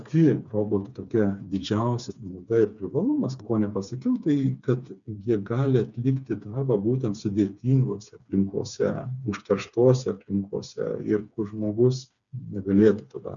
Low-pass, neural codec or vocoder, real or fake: 7.2 kHz; codec, 16 kHz, 4 kbps, FreqCodec, smaller model; fake